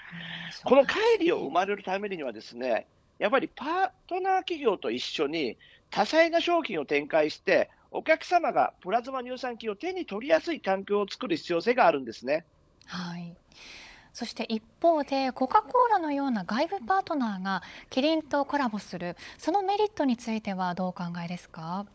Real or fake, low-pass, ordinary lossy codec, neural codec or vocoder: fake; none; none; codec, 16 kHz, 16 kbps, FunCodec, trained on LibriTTS, 50 frames a second